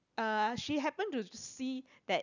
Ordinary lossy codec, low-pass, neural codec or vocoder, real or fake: none; 7.2 kHz; none; real